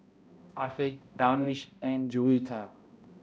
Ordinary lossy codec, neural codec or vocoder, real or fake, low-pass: none; codec, 16 kHz, 0.5 kbps, X-Codec, HuBERT features, trained on balanced general audio; fake; none